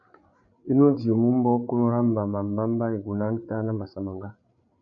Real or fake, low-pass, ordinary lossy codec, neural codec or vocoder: fake; 7.2 kHz; MP3, 64 kbps; codec, 16 kHz, 8 kbps, FreqCodec, larger model